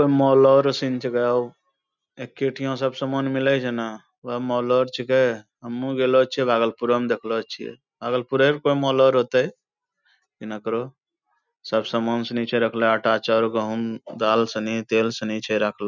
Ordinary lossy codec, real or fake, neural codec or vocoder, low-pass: none; real; none; 7.2 kHz